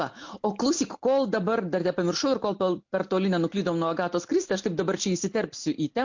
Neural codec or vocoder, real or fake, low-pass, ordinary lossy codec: none; real; 7.2 kHz; MP3, 48 kbps